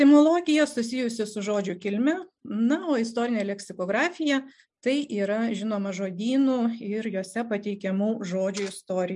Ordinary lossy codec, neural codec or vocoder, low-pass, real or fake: MP3, 96 kbps; none; 10.8 kHz; real